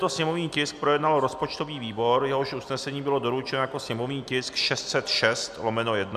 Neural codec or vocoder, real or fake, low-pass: none; real; 14.4 kHz